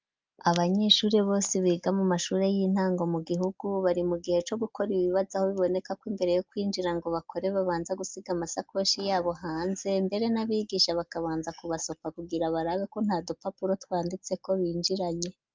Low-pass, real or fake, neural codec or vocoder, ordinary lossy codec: 7.2 kHz; real; none; Opus, 24 kbps